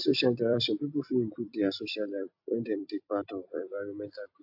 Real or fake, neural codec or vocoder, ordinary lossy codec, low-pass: fake; vocoder, 44.1 kHz, 128 mel bands every 256 samples, BigVGAN v2; AAC, 48 kbps; 5.4 kHz